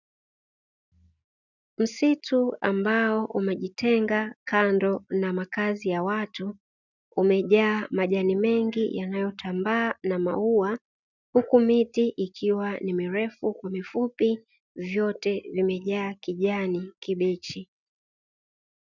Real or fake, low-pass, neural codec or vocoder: real; 7.2 kHz; none